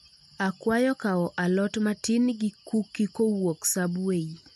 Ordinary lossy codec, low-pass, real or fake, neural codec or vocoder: MP3, 64 kbps; 14.4 kHz; real; none